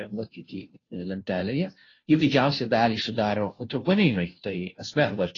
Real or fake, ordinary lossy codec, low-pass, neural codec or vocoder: fake; AAC, 32 kbps; 7.2 kHz; codec, 16 kHz, 0.5 kbps, FunCodec, trained on Chinese and English, 25 frames a second